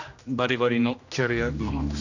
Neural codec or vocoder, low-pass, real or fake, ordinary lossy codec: codec, 16 kHz, 1 kbps, X-Codec, HuBERT features, trained on general audio; 7.2 kHz; fake; none